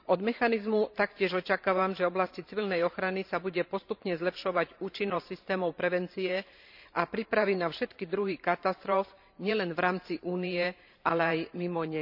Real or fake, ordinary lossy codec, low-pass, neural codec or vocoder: fake; none; 5.4 kHz; vocoder, 44.1 kHz, 128 mel bands every 512 samples, BigVGAN v2